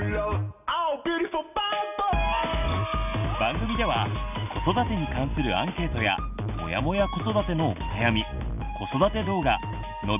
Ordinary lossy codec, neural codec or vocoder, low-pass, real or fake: none; none; 3.6 kHz; real